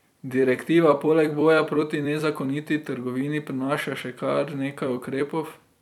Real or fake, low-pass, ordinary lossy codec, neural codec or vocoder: fake; 19.8 kHz; none; vocoder, 44.1 kHz, 128 mel bands every 256 samples, BigVGAN v2